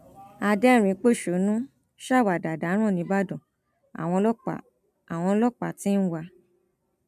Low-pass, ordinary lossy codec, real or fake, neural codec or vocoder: 14.4 kHz; MP3, 96 kbps; real; none